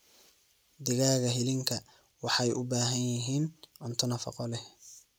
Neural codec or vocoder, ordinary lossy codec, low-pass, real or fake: none; none; none; real